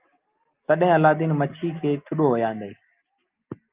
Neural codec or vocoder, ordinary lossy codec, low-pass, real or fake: none; Opus, 24 kbps; 3.6 kHz; real